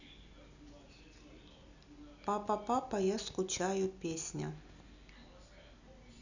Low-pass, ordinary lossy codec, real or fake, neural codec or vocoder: 7.2 kHz; none; real; none